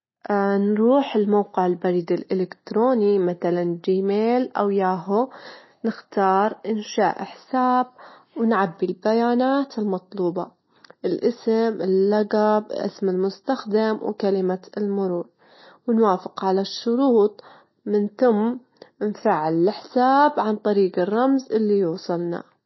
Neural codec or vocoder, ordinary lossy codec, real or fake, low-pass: none; MP3, 24 kbps; real; 7.2 kHz